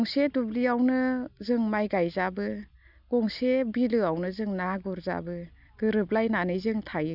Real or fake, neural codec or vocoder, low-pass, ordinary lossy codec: real; none; 5.4 kHz; none